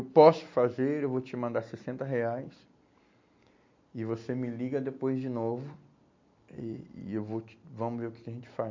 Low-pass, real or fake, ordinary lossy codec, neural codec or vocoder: 7.2 kHz; real; MP3, 48 kbps; none